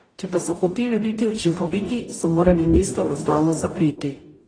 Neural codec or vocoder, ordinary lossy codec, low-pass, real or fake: codec, 44.1 kHz, 0.9 kbps, DAC; AAC, 32 kbps; 9.9 kHz; fake